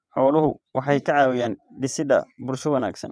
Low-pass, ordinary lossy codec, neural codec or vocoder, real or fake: none; none; vocoder, 22.05 kHz, 80 mel bands, WaveNeXt; fake